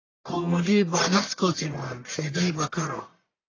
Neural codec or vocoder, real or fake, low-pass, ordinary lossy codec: codec, 44.1 kHz, 1.7 kbps, Pupu-Codec; fake; 7.2 kHz; AAC, 32 kbps